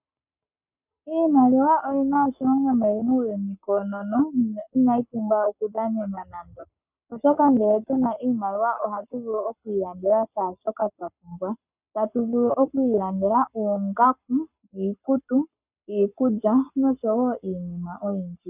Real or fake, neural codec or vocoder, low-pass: fake; codec, 44.1 kHz, 7.8 kbps, Pupu-Codec; 3.6 kHz